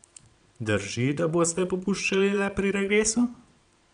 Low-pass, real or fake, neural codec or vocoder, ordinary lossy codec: 9.9 kHz; fake; vocoder, 22.05 kHz, 80 mel bands, Vocos; none